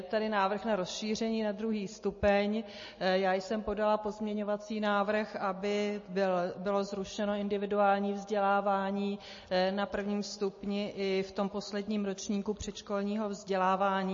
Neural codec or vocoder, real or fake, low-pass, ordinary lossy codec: none; real; 7.2 kHz; MP3, 32 kbps